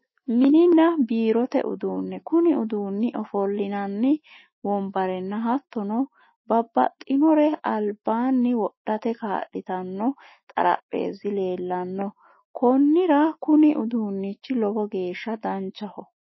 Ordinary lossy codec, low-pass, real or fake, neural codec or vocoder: MP3, 24 kbps; 7.2 kHz; fake; autoencoder, 48 kHz, 128 numbers a frame, DAC-VAE, trained on Japanese speech